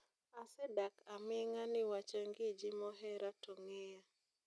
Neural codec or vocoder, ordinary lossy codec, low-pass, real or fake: none; none; none; real